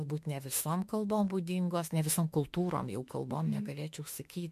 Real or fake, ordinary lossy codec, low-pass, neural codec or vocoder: fake; MP3, 64 kbps; 14.4 kHz; autoencoder, 48 kHz, 32 numbers a frame, DAC-VAE, trained on Japanese speech